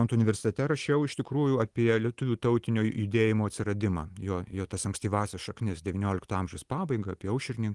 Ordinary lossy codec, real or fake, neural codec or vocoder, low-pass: Opus, 24 kbps; real; none; 10.8 kHz